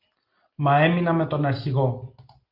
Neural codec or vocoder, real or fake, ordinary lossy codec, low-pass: none; real; Opus, 32 kbps; 5.4 kHz